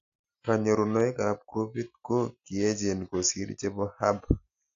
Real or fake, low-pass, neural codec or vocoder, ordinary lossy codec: real; 7.2 kHz; none; AAC, 64 kbps